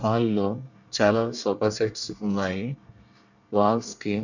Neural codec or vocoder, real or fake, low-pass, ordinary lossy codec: codec, 24 kHz, 1 kbps, SNAC; fake; 7.2 kHz; none